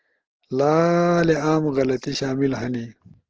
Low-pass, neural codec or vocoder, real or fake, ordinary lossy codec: 7.2 kHz; none; real; Opus, 16 kbps